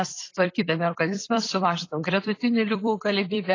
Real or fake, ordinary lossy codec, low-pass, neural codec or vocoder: fake; AAC, 32 kbps; 7.2 kHz; vocoder, 44.1 kHz, 128 mel bands every 256 samples, BigVGAN v2